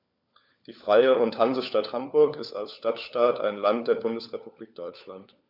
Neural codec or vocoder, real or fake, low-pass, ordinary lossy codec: codec, 16 kHz, 4 kbps, FunCodec, trained on LibriTTS, 50 frames a second; fake; 5.4 kHz; none